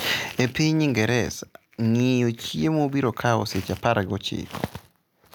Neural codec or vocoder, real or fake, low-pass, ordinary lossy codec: none; real; none; none